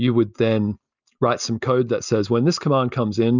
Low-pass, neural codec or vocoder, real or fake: 7.2 kHz; none; real